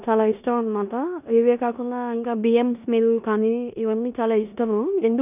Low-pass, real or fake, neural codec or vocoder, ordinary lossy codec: 3.6 kHz; fake; codec, 16 kHz in and 24 kHz out, 0.9 kbps, LongCat-Audio-Codec, four codebook decoder; none